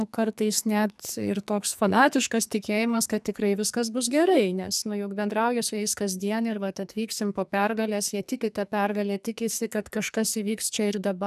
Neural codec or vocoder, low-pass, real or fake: codec, 44.1 kHz, 2.6 kbps, SNAC; 14.4 kHz; fake